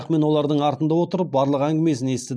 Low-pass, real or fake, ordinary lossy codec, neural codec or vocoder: none; real; none; none